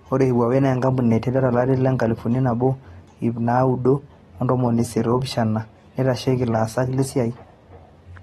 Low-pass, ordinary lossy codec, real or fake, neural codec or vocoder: 14.4 kHz; AAC, 32 kbps; real; none